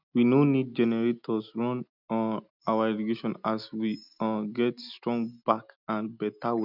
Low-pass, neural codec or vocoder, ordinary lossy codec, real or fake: 5.4 kHz; none; none; real